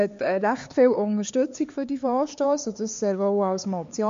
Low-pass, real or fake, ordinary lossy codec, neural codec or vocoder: 7.2 kHz; fake; MP3, 48 kbps; codec, 16 kHz, 4 kbps, X-Codec, HuBERT features, trained on LibriSpeech